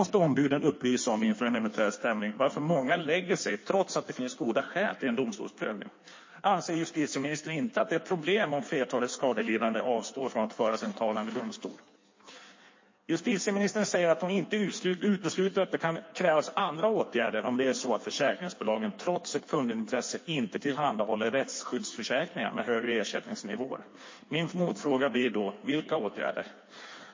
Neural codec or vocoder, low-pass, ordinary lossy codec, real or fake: codec, 16 kHz in and 24 kHz out, 1.1 kbps, FireRedTTS-2 codec; 7.2 kHz; MP3, 32 kbps; fake